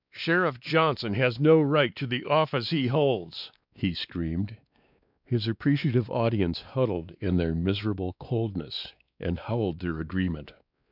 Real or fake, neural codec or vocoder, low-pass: fake; codec, 16 kHz, 2 kbps, X-Codec, WavLM features, trained on Multilingual LibriSpeech; 5.4 kHz